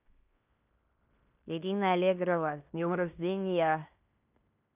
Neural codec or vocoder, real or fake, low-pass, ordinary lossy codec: codec, 16 kHz in and 24 kHz out, 0.9 kbps, LongCat-Audio-Codec, fine tuned four codebook decoder; fake; 3.6 kHz; none